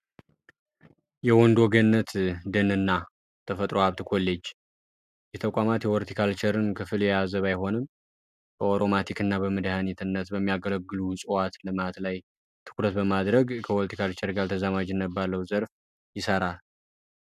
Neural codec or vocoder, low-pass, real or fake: none; 14.4 kHz; real